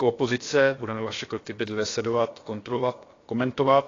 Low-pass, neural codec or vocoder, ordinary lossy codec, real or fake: 7.2 kHz; codec, 16 kHz, about 1 kbps, DyCAST, with the encoder's durations; AAC, 32 kbps; fake